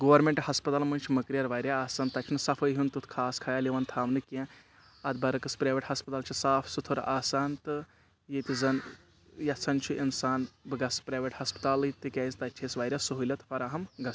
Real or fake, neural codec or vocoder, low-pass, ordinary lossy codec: real; none; none; none